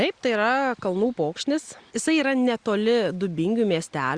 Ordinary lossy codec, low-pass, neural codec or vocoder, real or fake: Opus, 64 kbps; 9.9 kHz; none; real